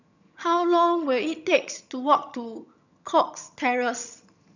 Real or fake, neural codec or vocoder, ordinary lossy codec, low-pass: fake; vocoder, 22.05 kHz, 80 mel bands, HiFi-GAN; none; 7.2 kHz